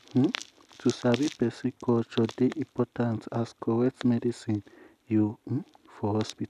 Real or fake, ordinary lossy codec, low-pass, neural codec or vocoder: real; none; 14.4 kHz; none